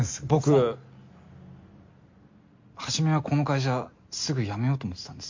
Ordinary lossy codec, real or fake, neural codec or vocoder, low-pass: MP3, 48 kbps; real; none; 7.2 kHz